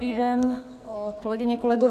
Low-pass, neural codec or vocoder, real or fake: 14.4 kHz; codec, 44.1 kHz, 2.6 kbps, SNAC; fake